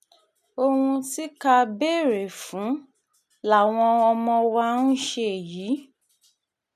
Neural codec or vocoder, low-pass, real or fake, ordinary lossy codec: none; 14.4 kHz; real; none